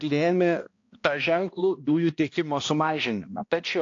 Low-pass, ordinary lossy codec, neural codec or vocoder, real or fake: 7.2 kHz; AAC, 48 kbps; codec, 16 kHz, 1 kbps, X-Codec, HuBERT features, trained on balanced general audio; fake